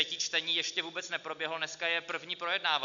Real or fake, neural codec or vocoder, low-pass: real; none; 7.2 kHz